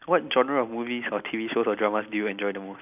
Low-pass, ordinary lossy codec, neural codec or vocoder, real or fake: 3.6 kHz; none; none; real